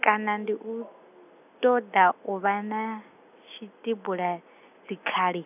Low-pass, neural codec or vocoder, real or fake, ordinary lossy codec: 3.6 kHz; none; real; none